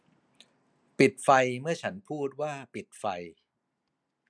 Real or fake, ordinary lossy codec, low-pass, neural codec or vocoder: real; none; none; none